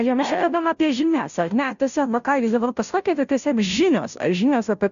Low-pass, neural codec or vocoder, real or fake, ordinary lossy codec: 7.2 kHz; codec, 16 kHz, 0.5 kbps, FunCodec, trained on Chinese and English, 25 frames a second; fake; AAC, 96 kbps